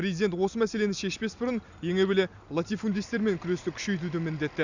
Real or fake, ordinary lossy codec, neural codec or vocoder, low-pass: real; none; none; 7.2 kHz